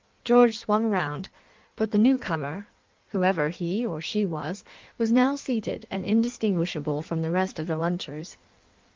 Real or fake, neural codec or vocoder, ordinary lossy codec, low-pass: fake; codec, 16 kHz in and 24 kHz out, 1.1 kbps, FireRedTTS-2 codec; Opus, 24 kbps; 7.2 kHz